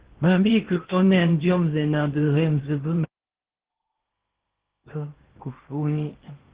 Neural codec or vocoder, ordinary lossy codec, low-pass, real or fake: codec, 16 kHz in and 24 kHz out, 0.8 kbps, FocalCodec, streaming, 65536 codes; Opus, 16 kbps; 3.6 kHz; fake